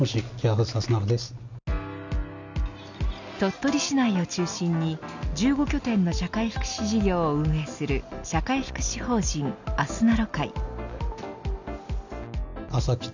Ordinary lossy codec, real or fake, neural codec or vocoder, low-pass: none; real; none; 7.2 kHz